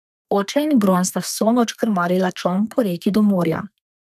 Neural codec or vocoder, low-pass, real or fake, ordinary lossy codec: codec, 32 kHz, 1.9 kbps, SNAC; 14.4 kHz; fake; none